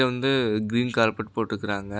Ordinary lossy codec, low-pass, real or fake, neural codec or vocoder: none; none; real; none